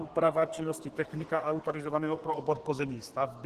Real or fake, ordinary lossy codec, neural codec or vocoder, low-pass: fake; Opus, 16 kbps; codec, 32 kHz, 1.9 kbps, SNAC; 14.4 kHz